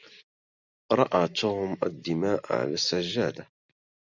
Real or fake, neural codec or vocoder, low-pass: real; none; 7.2 kHz